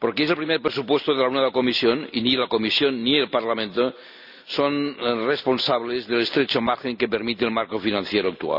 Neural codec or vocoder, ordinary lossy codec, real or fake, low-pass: none; none; real; 5.4 kHz